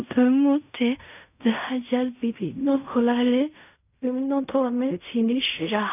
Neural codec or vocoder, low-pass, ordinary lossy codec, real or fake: codec, 16 kHz in and 24 kHz out, 0.4 kbps, LongCat-Audio-Codec, fine tuned four codebook decoder; 3.6 kHz; none; fake